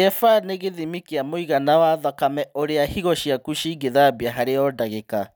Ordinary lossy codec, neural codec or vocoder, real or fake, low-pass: none; none; real; none